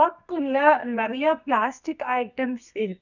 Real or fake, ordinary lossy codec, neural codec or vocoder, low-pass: fake; none; codec, 24 kHz, 0.9 kbps, WavTokenizer, medium music audio release; 7.2 kHz